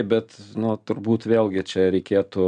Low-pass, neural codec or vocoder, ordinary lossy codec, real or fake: 9.9 kHz; none; MP3, 96 kbps; real